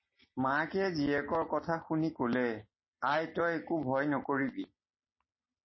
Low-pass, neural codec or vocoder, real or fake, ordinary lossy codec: 7.2 kHz; none; real; MP3, 24 kbps